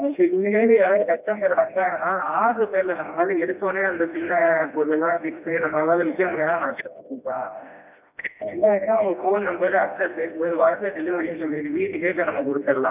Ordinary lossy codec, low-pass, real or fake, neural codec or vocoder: none; 3.6 kHz; fake; codec, 16 kHz, 1 kbps, FreqCodec, smaller model